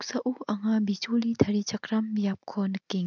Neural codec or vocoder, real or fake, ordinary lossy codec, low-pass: none; real; none; 7.2 kHz